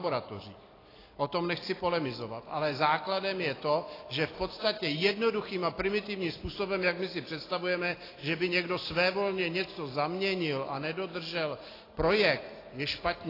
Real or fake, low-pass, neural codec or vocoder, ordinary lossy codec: real; 5.4 kHz; none; AAC, 24 kbps